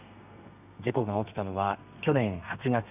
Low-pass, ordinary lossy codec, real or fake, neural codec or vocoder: 3.6 kHz; none; fake; codec, 32 kHz, 1.9 kbps, SNAC